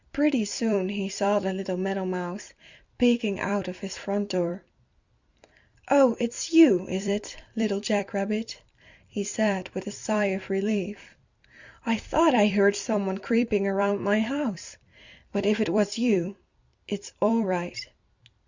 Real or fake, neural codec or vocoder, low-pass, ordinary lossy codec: fake; vocoder, 44.1 kHz, 128 mel bands every 256 samples, BigVGAN v2; 7.2 kHz; Opus, 64 kbps